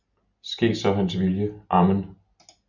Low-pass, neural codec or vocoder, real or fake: 7.2 kHz; none; real